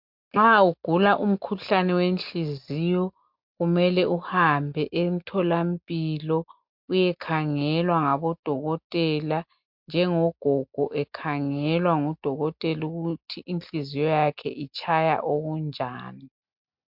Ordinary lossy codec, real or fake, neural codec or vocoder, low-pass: MP3, 48 kbps; real; none; 5.4 kHz